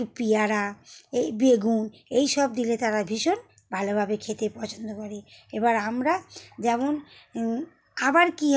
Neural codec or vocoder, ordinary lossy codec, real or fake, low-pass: none; none; real; none